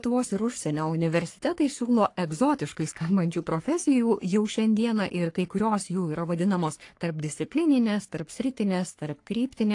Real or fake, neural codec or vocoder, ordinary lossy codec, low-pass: fake; codec, 44.1 kHz, 3.4 kbps, Pupu-Codec; AAC, 48 kbps; 10.8 kHz